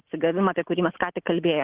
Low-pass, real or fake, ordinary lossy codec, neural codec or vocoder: 3.6 kHz; real; Opus, 16 kbps; none